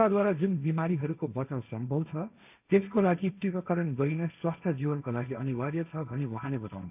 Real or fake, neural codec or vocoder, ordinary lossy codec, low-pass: fake; codec, 16 kHz, 1.1 kbps, Voila-Tokenizer; none; 3.6 kHz